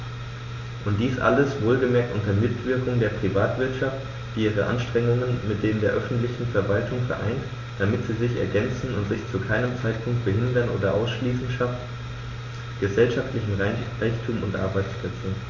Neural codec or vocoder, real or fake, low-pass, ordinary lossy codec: none; real; 7.2 kHz; MP3, 48 kbps